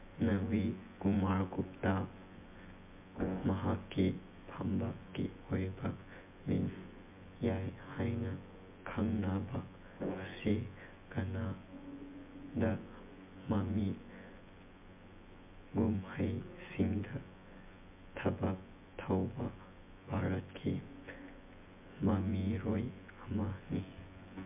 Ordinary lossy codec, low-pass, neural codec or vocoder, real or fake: MP3, 32 kbps; 3.6 kHz; vocoder, 24 kHz, 100 mel bands, Vocos; fake